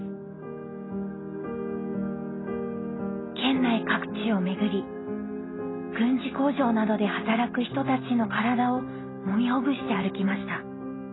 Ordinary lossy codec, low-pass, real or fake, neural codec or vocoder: AAC, 16 kbps; 7.2 kHz; real; none